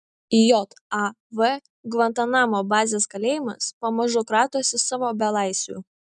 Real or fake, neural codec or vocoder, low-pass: real; none; 10.8 kHz